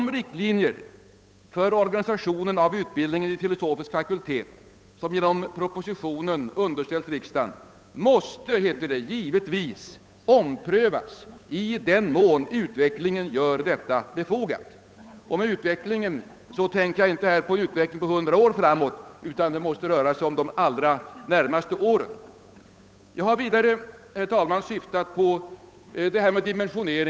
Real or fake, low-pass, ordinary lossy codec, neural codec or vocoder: fake; none; none; codec, 16 kHz, 8 kbps, FunCodec, trained on Chinese and English, 25 frames a second